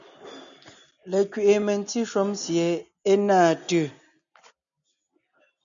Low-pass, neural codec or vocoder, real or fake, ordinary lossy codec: 7.2 kHz; none; real; MP3, 96 kbps